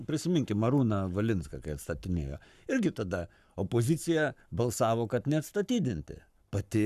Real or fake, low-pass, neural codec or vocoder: fake; 14.4 kHz; codec, 44.1 kHz, 7.8 kbps, Pupu-Codec